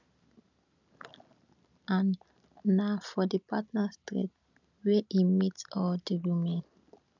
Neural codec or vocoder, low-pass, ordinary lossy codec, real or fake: none; 7.2 kHz; none; real